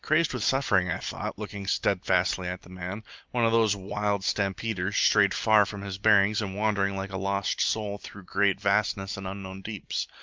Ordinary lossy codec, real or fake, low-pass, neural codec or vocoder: Opus, 24 kbps; real; 7.2 kHz; none